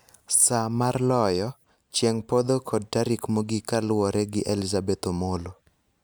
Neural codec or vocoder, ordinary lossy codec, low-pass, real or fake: none; none; none; real